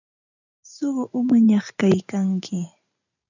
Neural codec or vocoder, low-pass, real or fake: vocoder, 44.1 kHz, 128 mel bands every 512 samples, BigVGAN v2; 7.2 kHz; fake